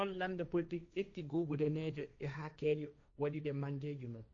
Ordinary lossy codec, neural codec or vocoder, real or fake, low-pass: none; codec, 16 kHz, 1.1 kbps, Voila-Tokenizer; fake; 7.2 kHz